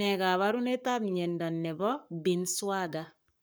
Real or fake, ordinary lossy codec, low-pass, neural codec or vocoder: fake; none; none; codec, 44.1 kHz, 7.8 kbps, Pupu-Codec